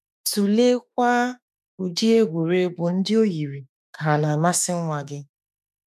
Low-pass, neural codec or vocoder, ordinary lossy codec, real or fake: 14.4 kHz; autoencoder, 48 kHz, 32 numbers a frame, DAC-VAE, trained on Japanese speech; none; fake